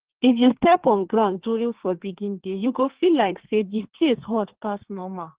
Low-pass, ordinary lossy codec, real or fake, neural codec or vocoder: 3.6 kHz; Opus, 16 kbps; fake; codec, 32 kHz, 1.9 kbps, SNAC